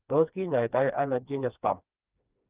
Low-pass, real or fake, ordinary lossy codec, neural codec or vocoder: 3.6 kHz; fake; Opus, 24 kbps; codec, 16 kHz, 2 kbps, FreqCodec, smaller model